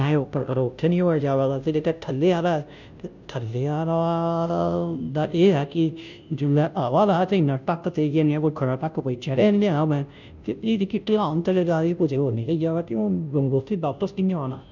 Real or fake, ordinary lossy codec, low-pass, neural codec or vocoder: fake; none; 7.2 kHz; codec, 16 kHz, 0.5 kbps, FunCodec, trained on Chinese and English, 25 frames a second